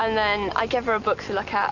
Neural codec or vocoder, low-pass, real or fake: none; 7.2 kHz; real